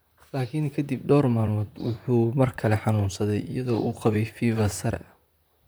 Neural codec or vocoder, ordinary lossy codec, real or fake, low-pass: vocoder, 44.1 kHz, 128 mel bands, Pupu-Vocoder; none; fake; none